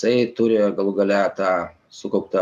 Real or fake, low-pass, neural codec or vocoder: real; 14.4 kHz; none